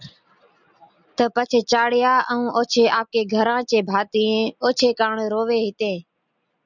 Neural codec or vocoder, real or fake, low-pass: none; real; 7.2 kHz